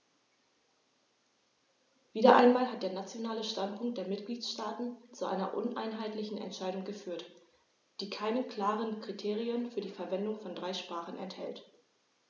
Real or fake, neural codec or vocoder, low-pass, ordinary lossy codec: real; none; 7.2 kHz; none